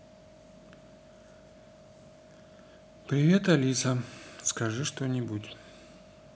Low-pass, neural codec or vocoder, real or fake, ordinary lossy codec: none; none; real; none